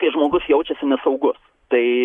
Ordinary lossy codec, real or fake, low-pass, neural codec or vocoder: Opus, 64 kbps; real; 10.8 kHz; none